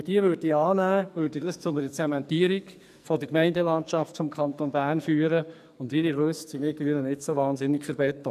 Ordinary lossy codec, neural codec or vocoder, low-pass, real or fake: none; codec, 44.1 kHz, 2.6 kbps, SNAC; 14.4 kHz; fake